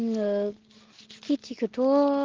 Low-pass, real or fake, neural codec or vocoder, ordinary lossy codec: 7.2 kHz; real; none; Opus, 16 kbps